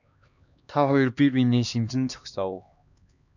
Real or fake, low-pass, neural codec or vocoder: fake; 7.2 kHz; codec, 16 kHz, 2 kbps, X-Codec, HuBERT features, trained on LibriSpeech